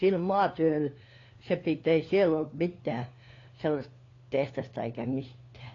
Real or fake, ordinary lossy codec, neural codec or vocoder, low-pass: fake; AAC, 32 kbps; codec, 16 kHz, 4 kbps, FunCodec, trained on LibriTTS, 50 frames a second; 7.2 kHz